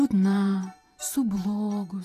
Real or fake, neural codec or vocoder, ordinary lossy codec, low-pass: fake; vocoder, 44.1 kHz, 128 mel bands every 512 samples, BigVGAN v2; AAC, 48 kbps; 14.4 kHz